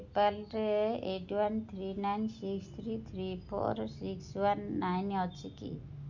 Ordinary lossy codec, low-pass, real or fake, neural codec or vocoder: none; 7.2 kHz; real; none